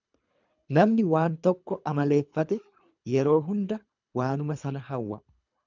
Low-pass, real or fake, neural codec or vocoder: 7.2 kHz; fake; codec, 24 kHz, 3 kbps, HILCodec